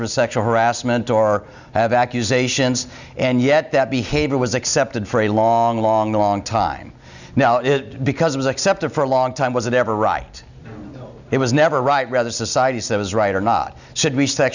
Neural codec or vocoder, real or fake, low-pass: none; real; 7.2 kHz